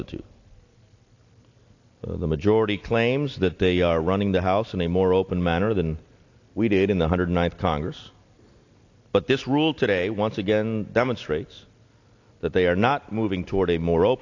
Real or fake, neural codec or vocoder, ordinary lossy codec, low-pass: real; none; AAC, 48 kbps; 7.2 kHz